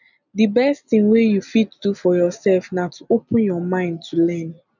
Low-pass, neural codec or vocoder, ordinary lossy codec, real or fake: 7.2 kHz; none; none; real